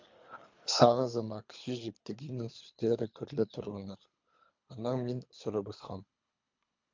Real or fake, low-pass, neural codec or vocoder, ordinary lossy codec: fake; 7.2 kHz; codec, 24 kHz, 3 kbps, HILCodec; MP3, 64 kbps